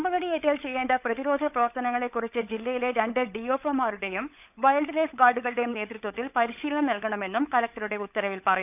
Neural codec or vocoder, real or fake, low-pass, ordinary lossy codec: codec, 16 kHz, 16 kbps, FunCodec, trained on LibriTTS, 50 frames a second; fake; 3.6 kHz; none